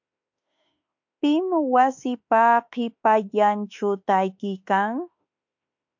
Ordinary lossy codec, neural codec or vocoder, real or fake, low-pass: MP3, 48 kbps; autoencoder, 48 kHz, 32 numbers a frame, DAC-VAE, trained on Japanese speech; fake; 7.2 kHz